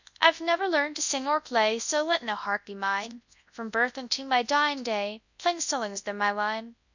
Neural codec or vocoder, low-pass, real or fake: codec, 24 kHz, 0.9 kbps, WavTokenizer, large speech release; 7.2 kHz; fake